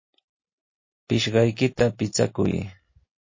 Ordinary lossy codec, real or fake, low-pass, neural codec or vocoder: MP3, 32 kbps; real; 7.2 kHz; none